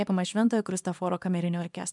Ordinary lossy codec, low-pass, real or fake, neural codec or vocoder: MP3, 96 kbps; 10.8 kHz; fake; codec, 24 kHz, 0.9 kbps, WavTokenizer, small release